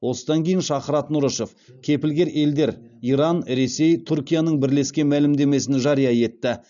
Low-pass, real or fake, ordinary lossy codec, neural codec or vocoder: 7.2 kHz; real; none; none